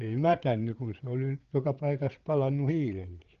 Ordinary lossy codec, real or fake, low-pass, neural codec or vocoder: Opus, 16 kbps; fake; 7.2 kHz; codec, 16 kHz, 8 kbps, FreqCodec, larger model